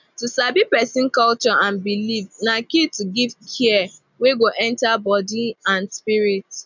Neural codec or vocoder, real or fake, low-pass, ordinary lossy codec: none; real; 7.2 kHz; none